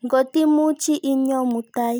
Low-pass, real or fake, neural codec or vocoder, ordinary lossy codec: none; real; none; none